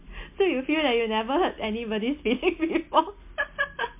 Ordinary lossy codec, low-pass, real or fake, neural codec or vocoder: MP3, 24 kbps; 3.6 kHz; real; none